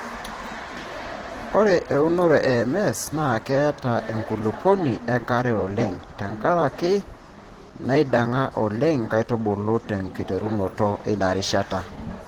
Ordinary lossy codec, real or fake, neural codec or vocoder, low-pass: Opus, 16 kbps; fake; vocoder, 44.1 kHz, 128 mel bands, Pupu-Vocoder; 19.8 kHz